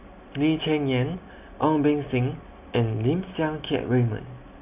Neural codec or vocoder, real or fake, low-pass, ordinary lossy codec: none; real; 3.6 kHz; none